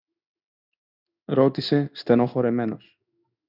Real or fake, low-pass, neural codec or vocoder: fake; 5.4 kHz; codec, 16 kHz in and 24 kHz out, 1 kbps, XY-Tokenizer